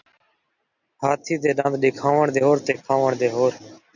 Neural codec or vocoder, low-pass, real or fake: none; 7.2 kHz; real